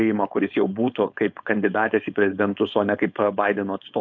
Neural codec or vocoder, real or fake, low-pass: codec, 16 kHz, 4.8 kbps, FACodec; fake; 7.2 kHz